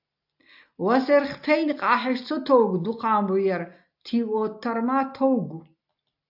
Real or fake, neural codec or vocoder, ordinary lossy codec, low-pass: real; none; AAC, 48 kbps; 5.4 kHz